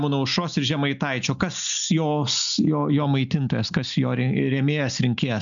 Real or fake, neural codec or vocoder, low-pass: real; none; 7.2 kHz